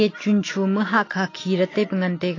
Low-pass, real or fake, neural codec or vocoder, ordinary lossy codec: 7.2 kHz; real; none; AAC, 32 kbps